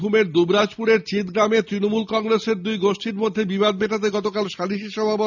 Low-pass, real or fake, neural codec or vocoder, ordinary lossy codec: 7.2 kHz; real; none; none